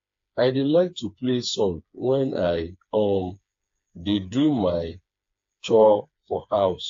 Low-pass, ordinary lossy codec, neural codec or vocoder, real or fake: 7.2 kHz; AAC, 48 kbps; codec, 16 kHz, 4 kbps, FreqCodec, smaller model; fake